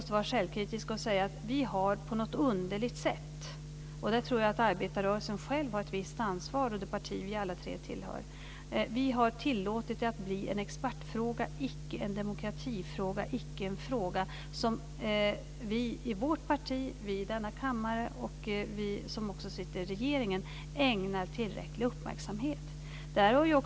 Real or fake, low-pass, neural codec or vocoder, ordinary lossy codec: real; none; none; none